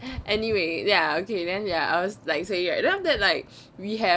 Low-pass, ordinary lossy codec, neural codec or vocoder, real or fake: none; none; none; real